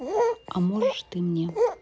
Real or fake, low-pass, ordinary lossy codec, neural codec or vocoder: real; none; none; none